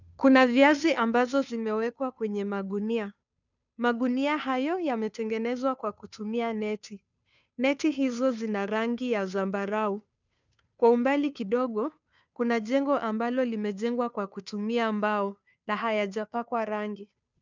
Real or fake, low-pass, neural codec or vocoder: fake; 7.2 kHz; codec, 16 kHz, 2 kbps, FunCodec, trained on Chinese and English, 25 frames a second